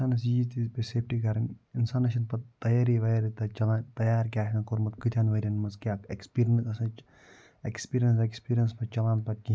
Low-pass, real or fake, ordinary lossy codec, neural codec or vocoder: none; real; none; none